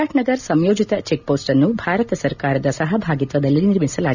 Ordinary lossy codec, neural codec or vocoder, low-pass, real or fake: none; none; 7.2 kHz; real